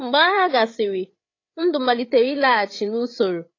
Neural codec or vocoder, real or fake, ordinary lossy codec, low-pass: codec, 16 kHz, 8 kbps, FreqCodec, larger model; fake; AAC, 32 kbps; 7.2 kHz